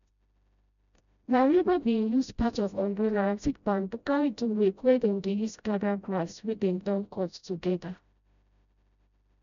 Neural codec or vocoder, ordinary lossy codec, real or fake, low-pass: codec, 16 kHz, 0.5 kbps, FreqCodec, smaller model; none; fake; 7.2 kHz